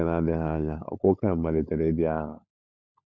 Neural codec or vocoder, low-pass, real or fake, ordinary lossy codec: codec, 16 kHz, 16 kbps, FunCodec, trained on LibriTTS, 50 frames a second; none; fake; none